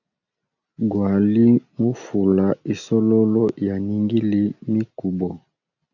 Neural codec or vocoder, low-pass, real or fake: none; 7.2 kHz; real